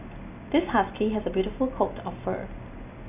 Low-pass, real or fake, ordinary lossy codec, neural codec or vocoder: 3.6 kHz; real; none; none